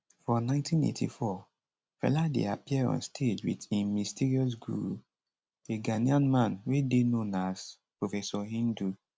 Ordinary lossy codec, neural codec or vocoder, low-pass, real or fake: none; none; none; real